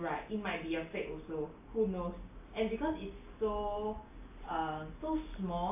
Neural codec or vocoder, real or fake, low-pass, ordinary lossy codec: none; real; 3.6 kHz; AAC, 32 kbps